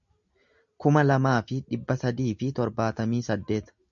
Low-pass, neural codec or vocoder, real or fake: 7.2 kHz; none; real